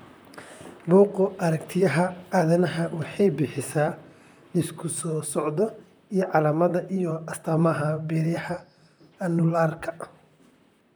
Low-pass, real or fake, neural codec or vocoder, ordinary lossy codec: none; fake; vocoder, 44.1 kHz, 128 mel bands, Pupu-Vocoder; none